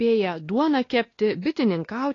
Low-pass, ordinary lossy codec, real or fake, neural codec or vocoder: 7.2 kHz; AAC, 32 kbps; fake; codec, 16 kHz, 2 kbps, X-Codec, WavLM features, trained on Multilingual LibriSpeech